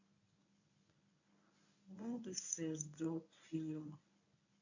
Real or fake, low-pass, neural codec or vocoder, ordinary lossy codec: fake; 7.2 kHz; codec, 24 kHz, 0.9 kbps, WavTokenizer, medium speech release version 1; none